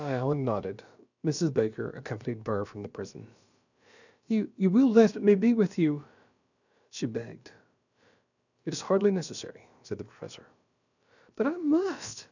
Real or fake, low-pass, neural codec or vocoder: fake; 7.2 kHz; codec, 16 kHz, about 1 kbps, DyCAST, with the encoder's durations